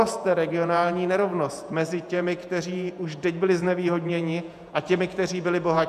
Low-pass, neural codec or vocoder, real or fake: 14.4 kHz; vocoder, 48 kHz, 128 mel bands, Vocos; fake